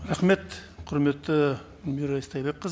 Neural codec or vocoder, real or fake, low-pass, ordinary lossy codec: none; real; none; none